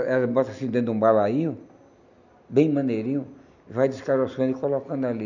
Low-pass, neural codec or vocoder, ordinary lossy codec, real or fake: 7.2 kHz; none; none; real